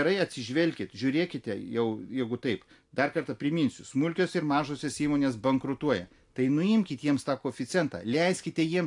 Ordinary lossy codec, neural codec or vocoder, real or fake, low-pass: AAC, 64 kbps; none; real; 10.8 kHz